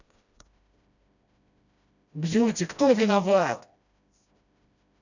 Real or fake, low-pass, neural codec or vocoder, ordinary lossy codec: fake; 7.2 kHz; codec, 16 kHz, 1 kbps, FreqCodec, smaller model; none